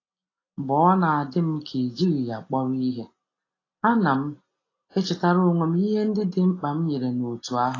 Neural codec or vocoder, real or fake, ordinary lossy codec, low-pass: none; real; AAC, 32 kbps; 7.2 kHz